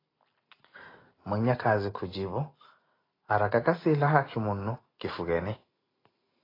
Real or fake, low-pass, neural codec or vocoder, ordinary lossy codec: real; 5.4 kHz; none; AAC, 24 kbps